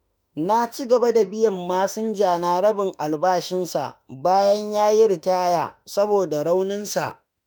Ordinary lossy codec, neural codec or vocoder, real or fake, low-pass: none; autoencoder, 48 kHz, 32 numbers a frame, DAC-VAE, trained on Japanese speech; fake; none